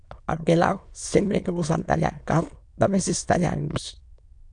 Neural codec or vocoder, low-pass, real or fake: autoencoder, 22.05 kHz, a latent of 192 numbers a frame, VITS, trained on many speakers; 9.9 kHz; fake